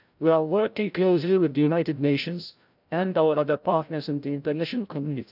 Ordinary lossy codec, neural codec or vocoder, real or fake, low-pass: none; codec, 16 kHz, 0.5 kbps, FreqCodec, larger model; fake; 5.4 kHz